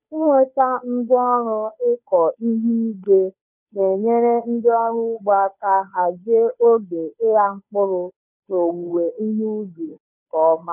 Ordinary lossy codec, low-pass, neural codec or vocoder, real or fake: none; 3.6 kHz; codec, 16 kHz, 2 kbps, FunCodec, trained on Chinese and English, 25 frames a second; fake